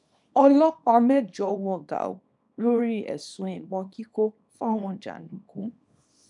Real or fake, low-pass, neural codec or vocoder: fake; 10.8 kHz; codec, 24 kHz, 0.9 kbps, WavTokenizer, small release